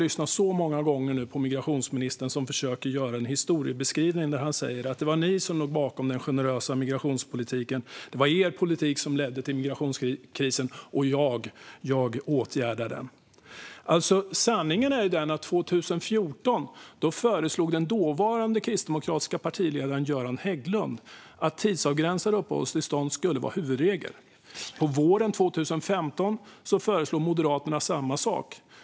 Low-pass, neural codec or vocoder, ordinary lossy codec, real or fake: none; none; none; real